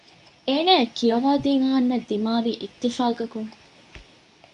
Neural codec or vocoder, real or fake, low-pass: codec, 24 kHz, 0.9 kbps, WavTokenizer, medium speech release version 2; fake; 10.8 kHz